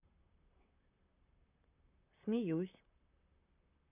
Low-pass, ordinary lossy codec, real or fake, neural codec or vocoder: 3.6 kHz; none; fake; vocoder, 44.1 kHz, 128 mel bands, Pupu-Vocoder